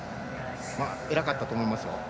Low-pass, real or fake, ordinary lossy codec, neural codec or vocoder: none; real; none; none